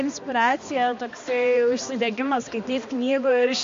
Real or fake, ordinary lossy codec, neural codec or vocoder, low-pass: fake; MP3, 48 kbps; codec, 16 kHz, 2 kbps, X-Codec, HuBERT features, trained on balanced general audio; 7.2 kHz